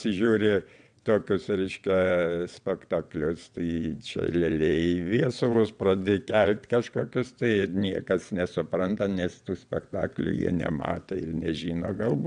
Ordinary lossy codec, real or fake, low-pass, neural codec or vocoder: AAC, 96 kbps; fake; 9.9 kHz; vocoder, 22.05 kHz, 80 mel bands, WaveNeXt